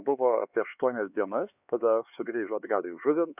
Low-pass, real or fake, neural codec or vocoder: 3.6 kHz; fake; codec, 16 kHz, 4 kbps, X-Codec, HuBERT features, trained on LibriSpeech